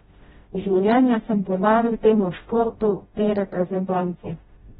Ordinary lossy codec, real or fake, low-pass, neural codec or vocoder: AAC, 16 kbps; fake; 7.2 kHz; codec, 16 kHz, 0.5 kbps, FreqCodec, smaller model